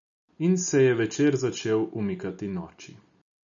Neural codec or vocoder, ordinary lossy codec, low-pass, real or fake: none; none; 7.2 kHz; real